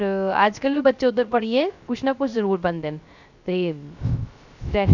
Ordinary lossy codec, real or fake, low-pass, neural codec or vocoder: none; fake; 7.2 kHz; codec, 16 kHz, 0.3 kbps, FocalCodec